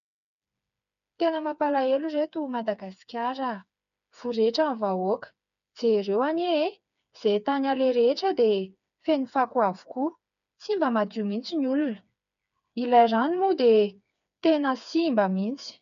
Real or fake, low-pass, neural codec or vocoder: fake; 7.2 kHz; codec, 16 kHz, 4 kbps, FreqCodec, smaller model